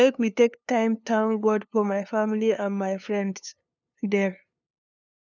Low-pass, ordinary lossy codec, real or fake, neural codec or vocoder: 7.2 kHz; none; fake; codec, 16 kHz, 2 kbps, FunCodec, trained on LibriTTS, 25 frames a second